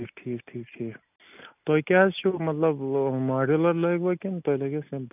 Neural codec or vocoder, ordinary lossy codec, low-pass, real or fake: none; none; 3.6 kHz; real